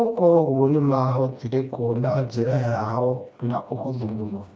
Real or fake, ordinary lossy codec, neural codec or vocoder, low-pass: fake; none; codec, 16 kHz, 1 kbps, FreqCodec, smaller model; none